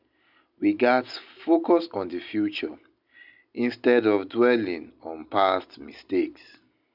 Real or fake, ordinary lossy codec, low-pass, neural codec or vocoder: real; none; 5.4 kHz; none